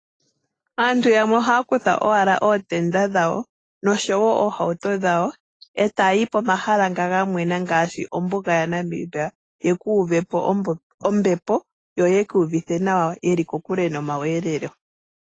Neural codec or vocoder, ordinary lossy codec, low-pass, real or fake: none; AAC, 32 kbps; 9.9 kHz; real